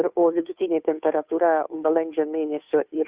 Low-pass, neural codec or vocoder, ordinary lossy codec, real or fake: 3.6 kHz; codec, 16 kHz, 2 kbps, FunCodec, trained on Chinese and English, 25 frames a second; Opus, 64 kbps; fake